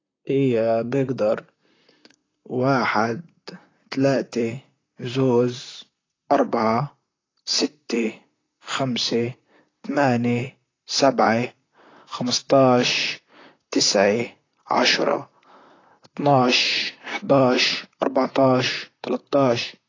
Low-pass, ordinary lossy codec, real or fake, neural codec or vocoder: 7.2 kHz; AAC, 32 kbps; fake; vocoder, 44.1 kHz, 128 mel bands, Pupu-Vocoder